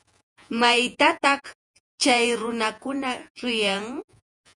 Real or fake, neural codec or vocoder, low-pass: fake; vocoder, 48 kHz, 128 mel bands, Vocos; 10.8 kHz